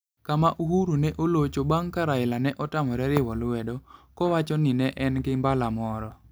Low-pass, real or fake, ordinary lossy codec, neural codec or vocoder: none; real; none; none